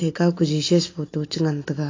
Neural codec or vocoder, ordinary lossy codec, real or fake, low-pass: none; AAC, 32 kbps; real; 7.2 kHz